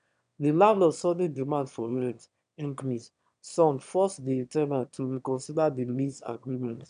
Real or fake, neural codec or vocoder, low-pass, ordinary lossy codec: fake; autoencoder, 22.05 kHz, a latent of 192 numbers a frame, VITS, trained on one speaker; 9.9 kHz; none